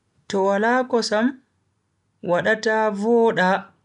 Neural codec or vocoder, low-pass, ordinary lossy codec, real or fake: none; 10.8 kHz; MP3, 96 kbps; real